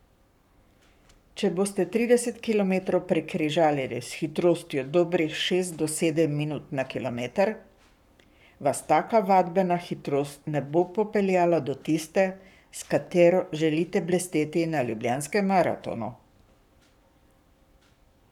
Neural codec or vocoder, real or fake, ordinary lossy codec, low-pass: codec, 44.1 kHz, 7.8 kbps, Pupu-Codec; fake; none; 19.8 kHz